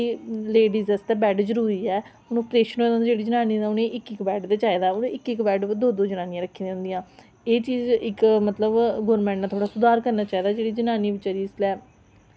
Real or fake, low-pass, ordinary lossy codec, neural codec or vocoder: real; none; none; none